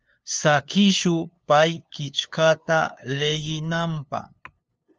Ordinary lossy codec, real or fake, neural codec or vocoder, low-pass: Opus, 24 kbps; fake; codec, 16 kHz, 2 kbps, FunCodec, trained on LibriTTS, 25 frames a second; 7.2 kHz